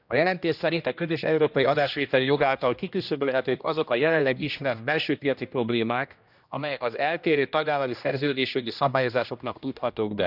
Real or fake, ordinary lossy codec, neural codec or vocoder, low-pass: fake; none; codec, 16 kHz, 1 kbps, X-Codec, HuBERT features, trained on general audio; 5.4 kHz